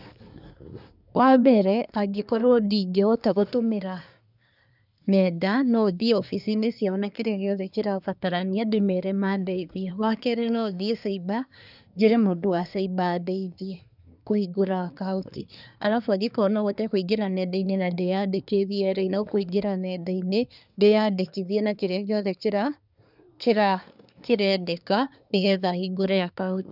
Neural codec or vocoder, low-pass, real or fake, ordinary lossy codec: codec, 24 kHz, 1 kbps, SNAC; 5.4 kHz; fake; none